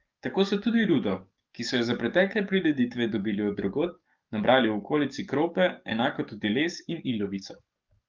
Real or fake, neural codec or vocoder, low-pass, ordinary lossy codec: fake; codec, 44.1 kHz, 7.8 kbps, DAC; 7.2 kHz; Opus, 24 kbps